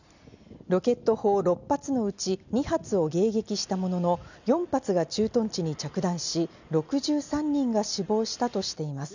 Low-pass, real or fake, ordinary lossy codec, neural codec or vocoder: 7.2 kHz; real; none; none